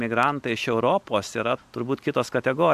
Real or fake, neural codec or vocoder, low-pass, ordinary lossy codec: real; none; 14.4 kHz; AAC, 96 kbps